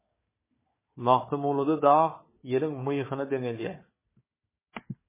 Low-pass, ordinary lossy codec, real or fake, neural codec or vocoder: 3.6 kHz; MP3, 16 kbps; fake; codec, 16 kHz, 4 kbps, FunCodec, trained on Chinese and English, 50 frames a second